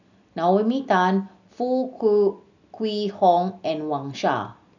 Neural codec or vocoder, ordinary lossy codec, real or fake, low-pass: none; none; real; 7.2 kHz